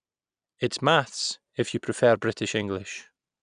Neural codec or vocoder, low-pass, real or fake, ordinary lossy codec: none; 9.9 kHz; real; none